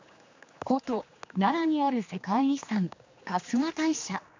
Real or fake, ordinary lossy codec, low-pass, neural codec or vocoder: fake; MP3, 64 kbps; 7.2 kHz; codec, 16 kHz, 2 kbps, X-Codec, HuBERT features, trained on general audio